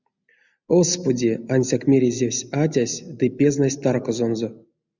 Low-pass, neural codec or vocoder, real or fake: 7.2 kHz; none; real